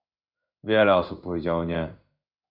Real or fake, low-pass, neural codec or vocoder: fake; 5.4 kHz; codec, 16 kHz in and 24 kHz out, 1 kbps, XY-Tokenizer